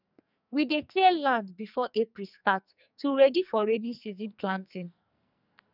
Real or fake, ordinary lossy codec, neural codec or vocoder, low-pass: fake; none; codec, 44.1 kHz, 2.6 kbps, SNAC; 5.4 kHz